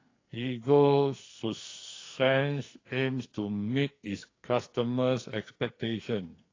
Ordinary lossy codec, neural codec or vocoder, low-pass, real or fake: AAC, 32 kbps; codec, 44.1 kHz, 2.6 kbps, SNAC; 7.2 kHz; fake